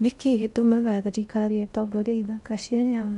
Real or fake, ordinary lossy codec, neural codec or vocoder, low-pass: fake; none; codec, 16 kHz in and 24 kHz out, 0.6 kbps, FocalCodec, streaming, 2048 codes; 10.8 kHz